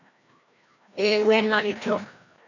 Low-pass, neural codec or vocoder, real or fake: 7.2 kHz; codec, 16 kHz, 1 kbps, FreqCodec, larger model; fake